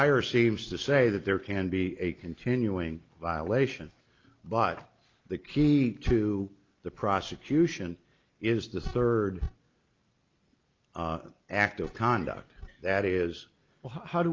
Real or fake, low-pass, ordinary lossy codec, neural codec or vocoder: real; 7.2 kHz; Opus, 24 kbps; none